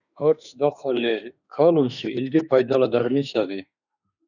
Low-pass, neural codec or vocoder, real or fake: 7.2 kHz; autoencoder, 48 kHz, 32 numbers a frame, DAC-VAE, trained on Japanese speech; fake